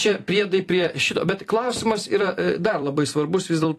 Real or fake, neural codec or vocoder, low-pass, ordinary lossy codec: fake; vocoder, 44.1 kHz, 128 mel bands every 256 samples, BigVGAN v2; 14.4 kHz; AAC, 48 kbps